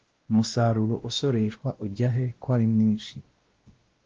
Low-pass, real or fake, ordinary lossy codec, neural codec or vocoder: 7.2 kHz; fake; Opus, 16 kbps; codec, 16 kHz, 1 kbps, X-Codec, WavLM features, trained on Multilingual LibriSpeech